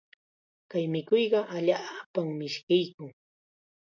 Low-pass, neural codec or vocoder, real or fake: 7.2 kHz; none; real